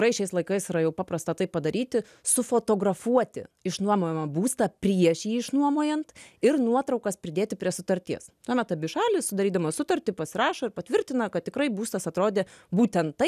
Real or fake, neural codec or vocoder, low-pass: real; none; 14.4 kHz